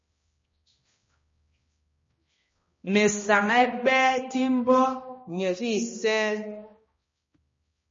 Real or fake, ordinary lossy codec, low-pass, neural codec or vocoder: fake; MP3, 32 kbps; 7.2 kHz; codec, 16 kHz, 1 kbps, X-Codec, HuBERT features, trained on balanced general audio